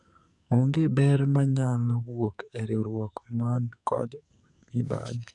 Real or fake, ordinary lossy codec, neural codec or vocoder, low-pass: fake; none; codec, 44.1 kHz, 2.6 kbps, SNAC; 10.8 kHz